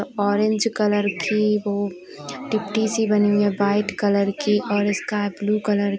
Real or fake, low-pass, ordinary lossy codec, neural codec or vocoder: real; none; none; none